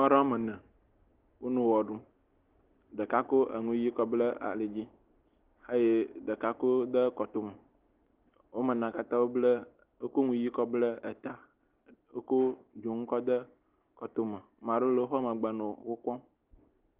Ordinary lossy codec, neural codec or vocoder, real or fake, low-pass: Opus, 16 kbps; none; real; 3.6 kHz